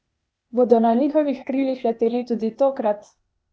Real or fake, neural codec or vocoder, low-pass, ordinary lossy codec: fake; codec, 16 kHz, 0.8 kbps, ZipCodec; none; none